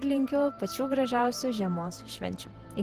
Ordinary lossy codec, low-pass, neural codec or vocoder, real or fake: Opus, 16 kbps; 14.4 kHz; vocoder, 44.1 kHz, 128 mel bands every 512 samples, BigVGAN v2; fake